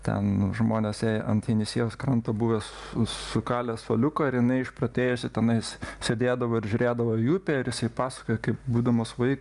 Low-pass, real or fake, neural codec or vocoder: 10.8 kHz; real; none